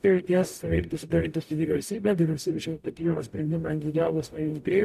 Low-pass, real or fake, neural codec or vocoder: 14.4 kHz; fake; codec, 44.1 kHz, 0.9 kbps, DAC